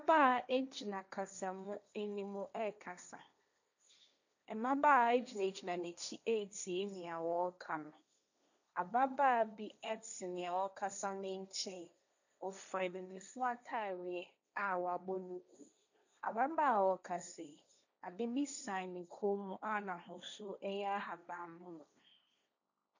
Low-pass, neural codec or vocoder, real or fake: 7.2 kHz; codec, 16 kHz, 1.1 kbps, Voila-Tokenizer; fake